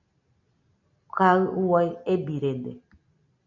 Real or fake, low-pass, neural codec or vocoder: real; 7.2 kHz; none